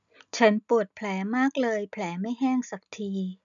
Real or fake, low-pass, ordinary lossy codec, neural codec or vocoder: real; 7.2 kHz; none; none